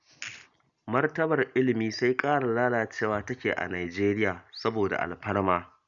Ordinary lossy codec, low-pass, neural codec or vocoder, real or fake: none; 7.2 kHz; none; real